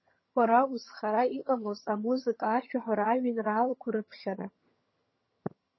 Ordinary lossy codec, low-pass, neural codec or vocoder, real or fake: MP3, 24 kbps; 7.2 kHz; vocoder, 22.05 kHz, 80 mel bands, HiFi-GAN; fake